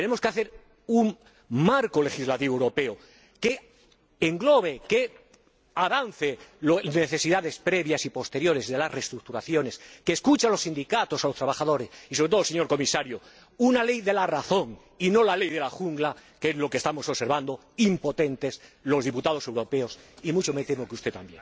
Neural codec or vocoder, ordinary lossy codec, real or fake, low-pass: none; none; real; none